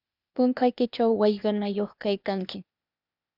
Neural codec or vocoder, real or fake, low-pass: codec, 16 kHz, 0.8 kbps, ZipCodec; fake; 5.4 kHz